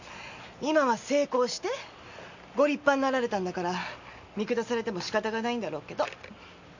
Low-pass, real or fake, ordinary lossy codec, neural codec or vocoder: 7.2 kHz; real; Opus, 64 kbps; none